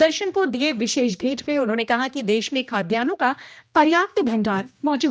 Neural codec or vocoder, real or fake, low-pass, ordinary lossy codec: codec, 16 kHz, 1 kbps, X-Codec, HuBERT features, trained on general audio; fake; none; none